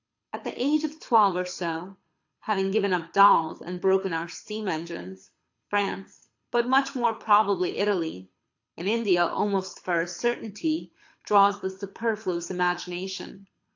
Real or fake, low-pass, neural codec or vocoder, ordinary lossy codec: fake; 7.2 kHz; codec, 24 kHz, 6 kbps, HILCodec; AAC, 48 kbps